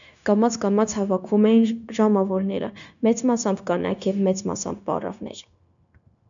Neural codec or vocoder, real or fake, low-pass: codec, 16 kHz, 0.9 kbps, LongCat-Audio-Codec; fake; 7.2 kHz